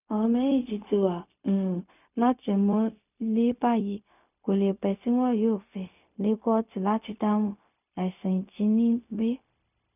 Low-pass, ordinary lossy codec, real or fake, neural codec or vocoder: 3.6 kHz; none; fake; codec, 16 kHz, 0.4 kbps, LongCat-Audio-Codec